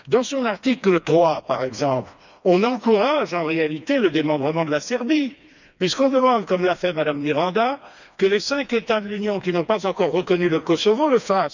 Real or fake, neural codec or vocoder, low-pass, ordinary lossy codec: fake; codec, 16 kHz, 2 kbps, FreqCodec, smaller model; 7.2 kHz; none